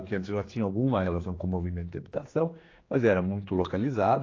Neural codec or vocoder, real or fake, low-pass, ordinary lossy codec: codec, 16 kHz, 2 kbps, X-Codec, HuBERT features, trained on general audio; fake; 7.2 kHz; AAC, 32 kbps